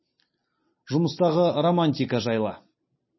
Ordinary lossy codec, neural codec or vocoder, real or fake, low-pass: MP3, 24 kbps; none; real; 7.2 kHz